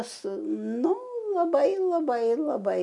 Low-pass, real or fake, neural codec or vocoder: 10.8 kHz; real; none